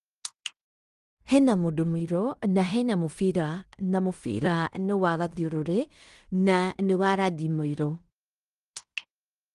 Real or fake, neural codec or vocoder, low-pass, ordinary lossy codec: fake; codec, 16 kHz in and 24 kHz out, 0.9 kbps, LongCat-Audio-Codec, fine tuned four codebook decoder; 10.8 kHz; Opus, 24 kbps